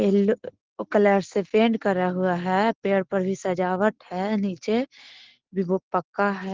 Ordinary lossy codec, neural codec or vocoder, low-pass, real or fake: Opus, 16 kbps; none; 7.2 kHz; real